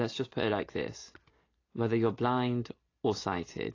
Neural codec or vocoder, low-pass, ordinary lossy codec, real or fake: none; 7.2 kHz; AAC, 32 kbps; real